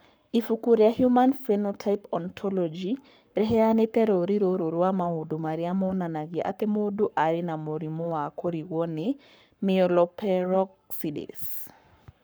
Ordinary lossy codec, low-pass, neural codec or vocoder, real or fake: none; none; codec, 44.1 kHz, 7.8 kbps, Pupu-Codec; fake